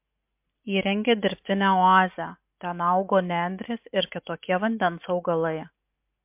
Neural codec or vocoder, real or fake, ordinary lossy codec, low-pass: none; real; MP3, 32 kbps; 3.6 kHz